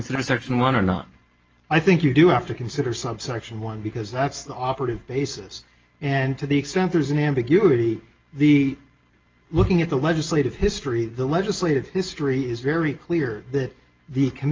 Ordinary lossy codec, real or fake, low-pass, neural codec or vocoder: Opus, 24 kbps; real; 7.2 kHz; none